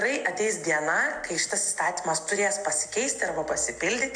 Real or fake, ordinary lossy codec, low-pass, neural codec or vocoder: real; AAC, 64 kbps; 9.9 kHz; none